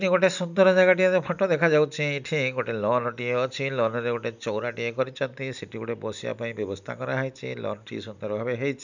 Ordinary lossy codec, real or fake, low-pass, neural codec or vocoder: none; real; 7.2 kHz; none